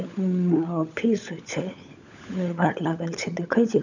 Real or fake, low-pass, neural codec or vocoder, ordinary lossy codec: fake; 7.2 kHz; codec, 16 kHz, 16 kbps, FunCodec, trained on LibriTTS, 50 frames a second; none